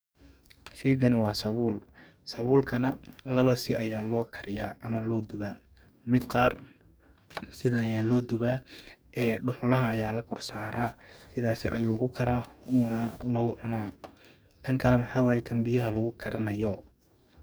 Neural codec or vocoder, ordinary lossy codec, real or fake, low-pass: codec, 44.1 kHz, 2.6 kbps, DAC; none; fake; none